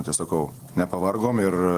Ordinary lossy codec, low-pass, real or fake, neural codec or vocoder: Opus, 24 kbps; 14.4 kHz; real; none